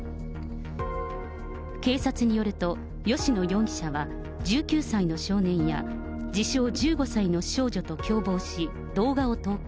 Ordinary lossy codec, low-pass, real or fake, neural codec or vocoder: none; none; real; none